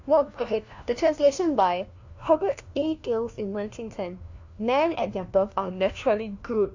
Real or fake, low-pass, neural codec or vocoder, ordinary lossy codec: fake; 7.2 kHz; codec, 16 kHz, 1 kbps, FunCodec, trained on LibriTTS, 50 frames a second; AAC, 48 kbps